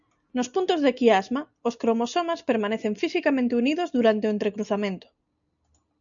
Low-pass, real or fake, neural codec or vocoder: 7.2 kHz; real; none